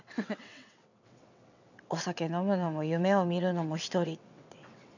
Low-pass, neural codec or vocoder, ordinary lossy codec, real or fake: 7.2 kHz; vocoder, 22.05 kHz, 80 mel bands, WaveNeXt; none; fake